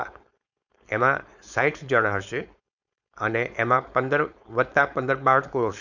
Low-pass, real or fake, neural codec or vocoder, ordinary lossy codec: 7.2 kHz; fake; codec, 16 kHz, 4.8 kbps, FACodec; none